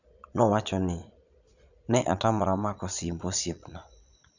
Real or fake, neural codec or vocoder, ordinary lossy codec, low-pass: fake; vocoder, 22.05 kHz, 80 mel bands, Vocos; none; 7.2 kHz